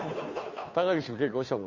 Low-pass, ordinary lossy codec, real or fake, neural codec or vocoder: 7.2 kHz; MP3, 32 kbps; fake; codec, 16 kHz, 2 kbps, FunCodec, trained on Chinese and English, 25 frames a second